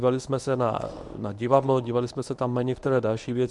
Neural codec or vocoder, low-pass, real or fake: codec, 24 kHz, 0.9 kbps, WavTokenizer, medium speech release version 1; 10.8 kHz; fake